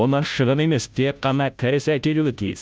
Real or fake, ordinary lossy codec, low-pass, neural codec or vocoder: fake; none; none; codec, 16 kHz, 0.5 kbps, FunCodec, trained on Chinese and English, 25 frames a second